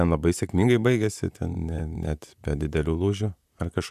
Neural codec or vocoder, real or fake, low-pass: vocoder, 44.1 kHz, 128 mel bands, Pupu-Vocoder; fake; 14.4 kHz